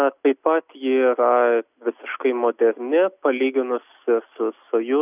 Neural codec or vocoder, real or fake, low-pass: none; real; 3.6 kHz